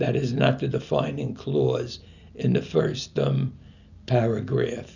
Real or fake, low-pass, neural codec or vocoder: real; 7.2 kHz; none